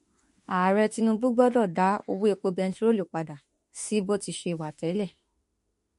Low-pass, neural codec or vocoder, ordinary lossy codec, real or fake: 14.4 kHz; autoencoder, 48 kHz, 32 numbers a frame, DAC-VAE, trained on Japanese speech; MP3, 48 kbps; fake